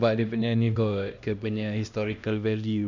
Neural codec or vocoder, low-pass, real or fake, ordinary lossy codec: codec, 16 kHz, 1 kbps, X-Codec, HuBERT features, trained on LibriSpeech; 7.2 kHz; fake; none